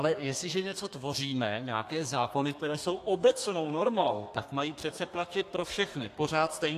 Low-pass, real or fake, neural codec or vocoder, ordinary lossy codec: 14.4 kHz; fake; codec, 32 kHz, 1.9 kbps, SNAC; AAC, 64 kbps